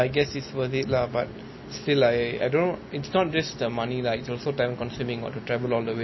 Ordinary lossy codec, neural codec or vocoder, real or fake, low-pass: MP3, 24 kbps; none; real; 7.2 kHz